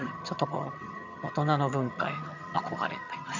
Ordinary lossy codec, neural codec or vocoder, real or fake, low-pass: none; vocoder, 22.05 kHz, 80 mel bands, HiFi-GAN; fake; 7.2 kHz